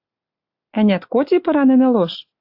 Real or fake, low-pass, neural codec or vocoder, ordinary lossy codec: real; 5.4 kHz; none; AAC, 32 kbps